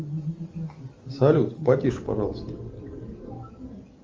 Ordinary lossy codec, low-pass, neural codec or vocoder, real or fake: Opus, 32 kbps; 7.2 kHz; none; real